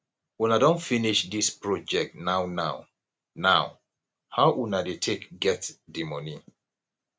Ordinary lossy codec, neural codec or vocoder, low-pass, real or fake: none; none; none; real